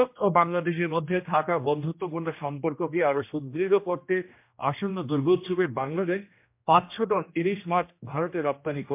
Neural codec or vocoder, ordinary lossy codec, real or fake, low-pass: codec, 16 kHz, 1 kbps, X-Codec, HuBERT features, trained on general audio; MP3, 32 kbps; fake; 3.6 kHz